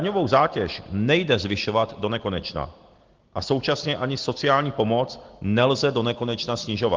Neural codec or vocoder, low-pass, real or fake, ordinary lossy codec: none; 7.2 kHz; real; Opus, 16 kbps